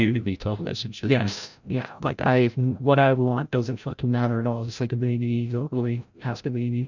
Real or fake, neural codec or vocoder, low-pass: fake; codec, 16 kHz, 0.5 kbps, FreqCodec, larger model; 7.2 kHz